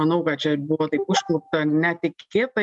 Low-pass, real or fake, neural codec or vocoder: 10.8 kHz; real; none